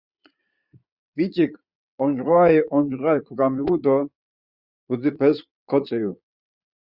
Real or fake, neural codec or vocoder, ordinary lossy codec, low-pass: fake; vocoder, 22.05 kHz, 80 mel bands, Vocos; Opus, 64 kbps; 5.4 kHz